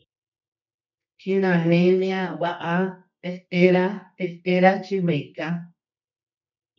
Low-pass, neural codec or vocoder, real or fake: 7.2 kHz; codec, 24 kHz, 0.9 kbps, WavTokenizer, medium music audio release; fake